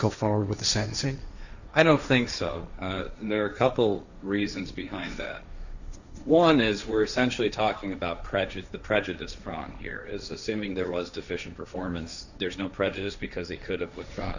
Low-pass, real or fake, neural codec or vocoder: 7.2 kHz; fake; codec, 16 kHz, 1.1 kbps, Voila-Tokenizer